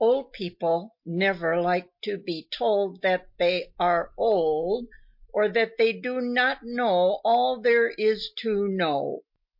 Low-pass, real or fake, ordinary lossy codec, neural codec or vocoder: 5.4 kHz; real; MP3, 32 kbps; none